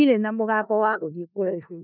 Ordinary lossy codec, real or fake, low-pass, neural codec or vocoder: none; fake; 5.4 kHz; codec, 16 kHz in and 24 kHz out, 0.4 kbps, LongCat-Audio-Codec, four codebook decoder